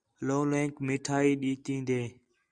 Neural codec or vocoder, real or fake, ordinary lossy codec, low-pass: none; real; Opus, 64 kbps; 9.9 kHz